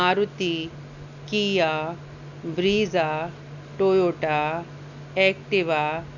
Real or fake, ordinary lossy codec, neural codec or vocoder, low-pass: real; none; none; 7.2 kHz